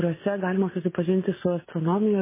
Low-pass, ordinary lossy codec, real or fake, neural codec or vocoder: 3.6 kHz; MP3, 16 kbps; real; none